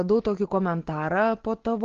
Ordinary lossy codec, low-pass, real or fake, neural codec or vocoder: Opus, 16 kbps; 7.2 kHz; real; none